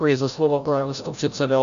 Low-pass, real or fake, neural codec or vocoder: 7.2 kHz; fake; codec, 16 kHz, 0.5 kbps, FreqCodec, larger model